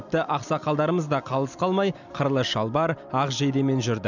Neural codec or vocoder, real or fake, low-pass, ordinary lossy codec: none; real; 7.2 kHz; none